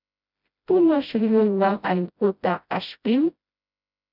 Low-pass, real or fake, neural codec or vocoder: 5.4 kHz; fake; codec, 16 kHz, 0.5 kbps, FreqCodec, smaller model